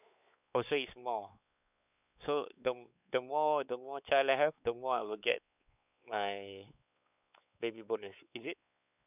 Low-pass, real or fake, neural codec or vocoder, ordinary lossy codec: 3.6 kHz; fake; codec, 16 kHz, 4 kbps, X-Codec, WavLM features, trained on Multilingual LibriSpeech; none